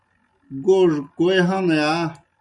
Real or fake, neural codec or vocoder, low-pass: real; none; 10.8 kHz